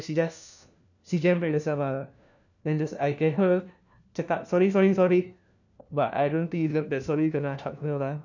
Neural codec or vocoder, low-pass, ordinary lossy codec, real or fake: codec, 16 kHz, 1 kbps, FunCodec, trained on LibriTTS, 50 frames a second; 7.2 kHz; none; fake